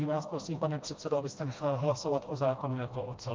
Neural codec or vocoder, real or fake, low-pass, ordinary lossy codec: codec, 16 kHz, 1 kbps, FreqCodec, smaller model; fake; 7.2 kHz; Opus, 24 kbps